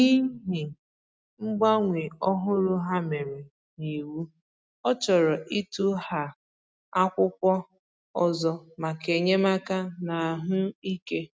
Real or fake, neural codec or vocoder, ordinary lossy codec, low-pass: real; none; none; none